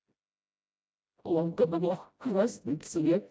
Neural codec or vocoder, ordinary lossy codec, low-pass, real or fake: codec, 16 kHz, 0.5 kbps, FreqCodec, smaller model; none; none; fake